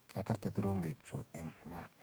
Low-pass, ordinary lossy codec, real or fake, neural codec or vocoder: none; none; fake; codec, 44.1 kHz, 2.6 kbps, DAC